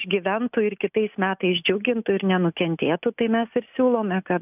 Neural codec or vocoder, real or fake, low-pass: none; real; 3.6 kHz